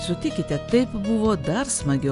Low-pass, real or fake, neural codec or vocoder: 10.8 kHz; real; none